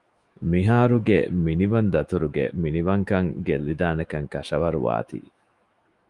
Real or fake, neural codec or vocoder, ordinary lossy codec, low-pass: fake; autoencoder, 48 kHz, 128 numbers a frame, DAC-VAE, trained on Japanese speech; Opus, 32 kbps; 10.8 kHz